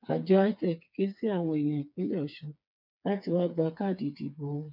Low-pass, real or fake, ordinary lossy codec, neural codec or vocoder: 5.4 kHz; fake; none; codec, 16 kHz, 4 kbps, FreqCodec, smaller model